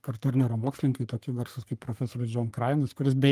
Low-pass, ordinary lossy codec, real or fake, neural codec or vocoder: 14.4 kHz; Opus, 32 kbps; fake; codec, 44.1 kHz, 3.4 kbps, Pupu-Codec